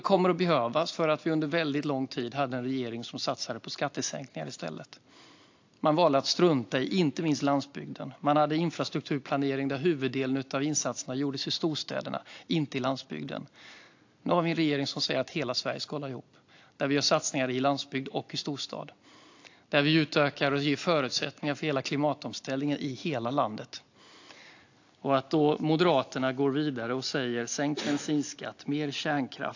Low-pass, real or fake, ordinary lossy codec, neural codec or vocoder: 7.2 kHz; real; AAC, 48 kbps; none